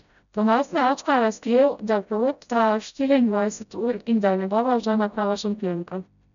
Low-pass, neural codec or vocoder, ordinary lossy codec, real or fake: 7.2 kHz; codec, 16 kHz, 0.5 kbps, FreqCodec, smaller model; none; fake